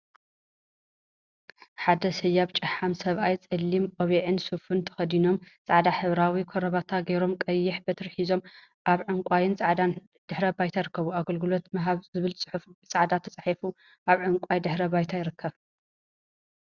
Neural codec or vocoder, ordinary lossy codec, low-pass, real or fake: none; Opus, 64 kbps; 7.2 kHz; real